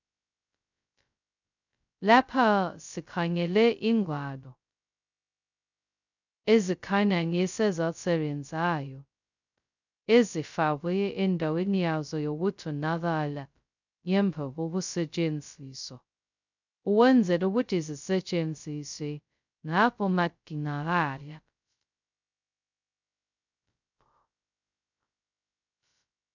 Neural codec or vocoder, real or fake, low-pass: codec, 16 kHz, 0.2 kbps, FocalCodec; fake; 7.2 kHz